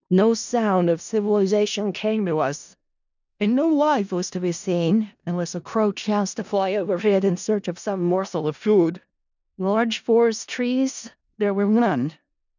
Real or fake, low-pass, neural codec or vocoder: fake; 7.2 kHz; codec, 16 kHz in and 24 kHz out, 0.4 kbps, LongCat-Audio-Codec, four codebook decoder